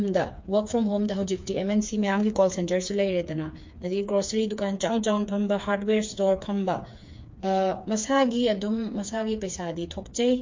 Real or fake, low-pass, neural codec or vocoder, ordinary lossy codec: fake; 7.2 kHz; codec, 16 kHz, 4 kbps, FreqCodec, smaller model; MP3, 48 kbps